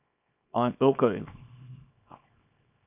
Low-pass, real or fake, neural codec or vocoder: 3.6 kHz; fake; codec, 24 kHz, 0.9 kbps, WavTokenizer, small release